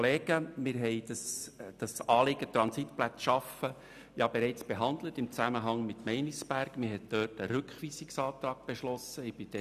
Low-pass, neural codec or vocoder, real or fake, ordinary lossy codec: 14.4 kHz; none; real; none